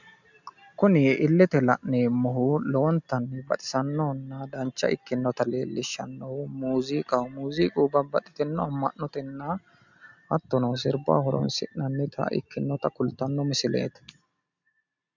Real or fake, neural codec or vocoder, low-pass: real; none; 7.2 kHz